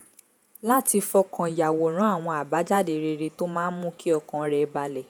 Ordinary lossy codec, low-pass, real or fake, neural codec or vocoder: none; none; fake; vocoder, 48 kHz, 128 mel bands, Vocos